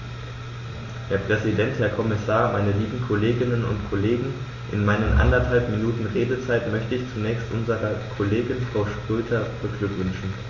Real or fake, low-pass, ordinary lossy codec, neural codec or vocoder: real; 7.2 kHz; MP3, 32 kbps; none